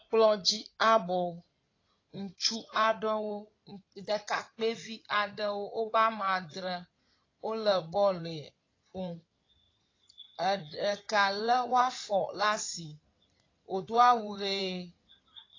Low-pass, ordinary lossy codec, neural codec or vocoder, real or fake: 7.2 kHz; AAC, 32 kbps; codec, 16 kHz in and 24 kHz out, 2.2 kbps, FireRedTTS-2 codec; fake